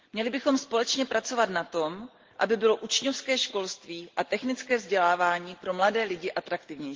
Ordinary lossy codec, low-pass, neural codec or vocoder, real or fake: Opus, 16 kbps; 7.2 kHz; none; real